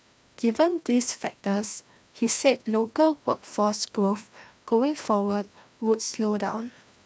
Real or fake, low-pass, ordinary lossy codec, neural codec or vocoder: fake; none; none; codec, 16 kHz, 1 kbps, FreqCodec, larger model